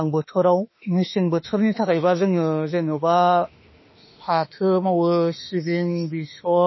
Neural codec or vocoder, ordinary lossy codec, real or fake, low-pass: autoencoder, 48 kHz, 32 numbers a frame, DAC-VAE, trained on Japanese speech; MP3, 24 kbps; fake; 7.2 kHz